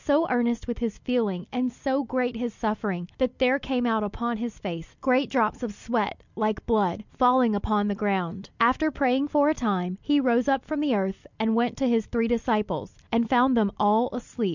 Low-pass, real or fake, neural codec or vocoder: 7.2 kHz; real; none